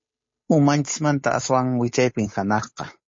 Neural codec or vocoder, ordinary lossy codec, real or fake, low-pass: codec, 16 kHz, 8 kbps, FunCodec, trained on Chinese and English, 25 frames a second; MP3, 32 kbps; fake; 7.2 kHz